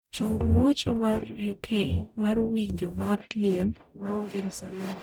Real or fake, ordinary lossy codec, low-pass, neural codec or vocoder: fake; none; none; codec, 44.1 kHz, 0.9 kbps, DAC